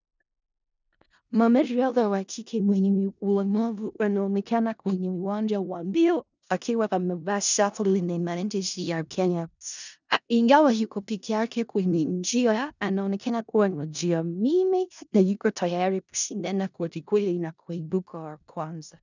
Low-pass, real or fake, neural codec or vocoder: 7.2 kHz; fake; codec, 16 kHz in and 24 kHz out, 0.4 kbps, LongCat-Audio-Codec, four codebook decoder